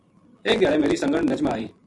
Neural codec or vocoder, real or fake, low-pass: none; real; 10.8 kHz